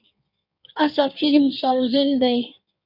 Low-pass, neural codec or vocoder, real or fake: 5.4 kHz; codec, 16 kHz in and 24 kHz out, 1.1 kbps, FireRedTTS-2 codec; fake